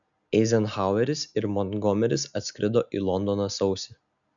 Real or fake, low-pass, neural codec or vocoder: real; 7.2 kHz; none